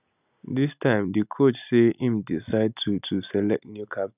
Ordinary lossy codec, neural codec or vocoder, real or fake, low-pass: none; none; real; 3.6 kHz